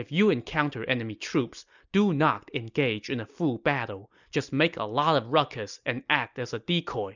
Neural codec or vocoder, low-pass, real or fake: none; 7.2 kHz; real